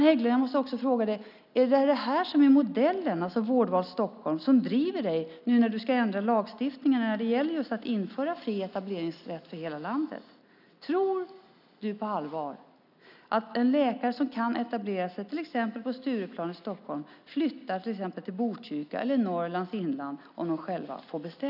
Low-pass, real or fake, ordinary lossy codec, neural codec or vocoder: 5.4 kHz; real; none; none